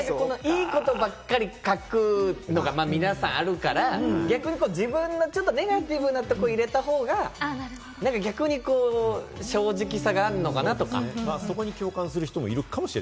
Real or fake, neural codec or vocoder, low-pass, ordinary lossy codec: real; none; none; none